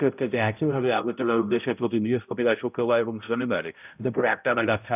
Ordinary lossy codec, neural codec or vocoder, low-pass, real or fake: none; codec, 16 kHz, 0.5 kbps, X-Codec, HuBERT features, trained on balanced general audio; 3.6 kHz; fake